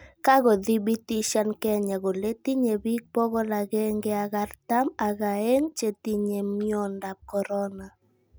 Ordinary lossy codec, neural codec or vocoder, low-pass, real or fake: none; none; none; real